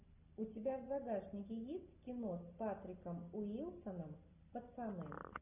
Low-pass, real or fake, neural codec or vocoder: 3.6 kHz; real; none